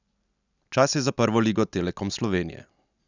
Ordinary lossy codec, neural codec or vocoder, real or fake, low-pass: none; none; real; 7.2 kHz